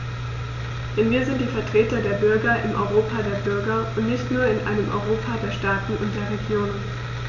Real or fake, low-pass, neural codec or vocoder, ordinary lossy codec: real; 7.2 kHz; none; none